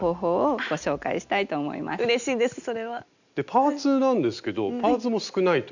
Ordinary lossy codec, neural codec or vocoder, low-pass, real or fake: none; none; 7.2 kHz; real